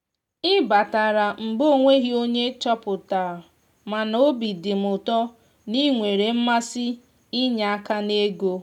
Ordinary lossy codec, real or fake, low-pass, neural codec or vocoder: none; real; 19.8 kHz; none